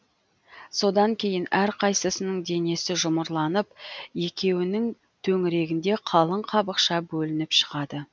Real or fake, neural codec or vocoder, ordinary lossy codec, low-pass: real; none; none; none